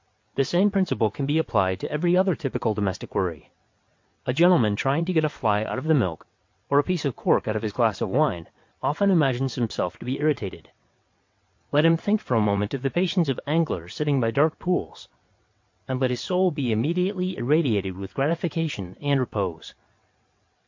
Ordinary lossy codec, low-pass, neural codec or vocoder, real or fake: MP3, 48 kbps; 7.2 kHz; vocoder, 22.05 kHz, 80 mel bands, WaveNeXt; fake